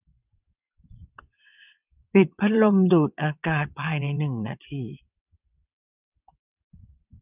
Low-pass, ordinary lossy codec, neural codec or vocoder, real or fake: 3.6 kHz; none; vocoder, 22.05 kHz, 80 mel bands, WaveNeXt; fake